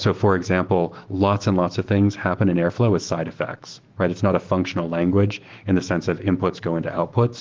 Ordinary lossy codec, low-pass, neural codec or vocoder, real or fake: Opus, 16 kbps; 7.2 kHz; autoencoder, 48 kHz, 128 numbers a frame, DAC-VAE, trained on Japanese speech; fake